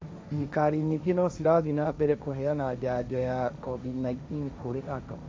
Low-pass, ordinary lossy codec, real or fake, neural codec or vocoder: none; none; fake; codec, 16 kHz, 1.1 kbps, Voila-Tokenizer